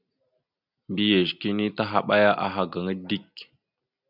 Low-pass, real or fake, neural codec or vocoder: 5.4 kHz; real; none